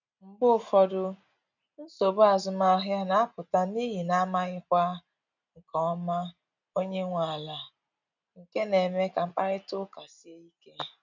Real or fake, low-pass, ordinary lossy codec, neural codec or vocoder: real; 7.2 kHz; none; none